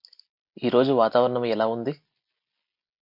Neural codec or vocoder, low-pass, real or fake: none; 5.4 kHz; real